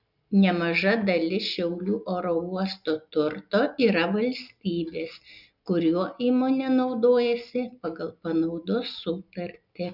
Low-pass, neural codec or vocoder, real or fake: 5.4 kHz; none; real